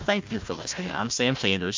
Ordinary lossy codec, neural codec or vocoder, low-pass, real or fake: MP3, 64 kbps; codec, 16 kHz, 1 kbps, FunCodec, trained on Chinese and English, 50 frames a second; 7.2 kHz; fake